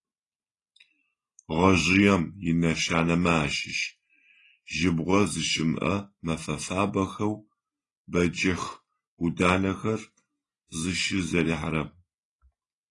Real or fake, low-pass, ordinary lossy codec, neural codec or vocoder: real; 10.8 kHz; AAC, 32 kbps; none